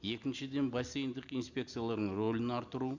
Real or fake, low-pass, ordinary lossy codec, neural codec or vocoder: real; 7.2 kHz; Opus, 64 kbps; none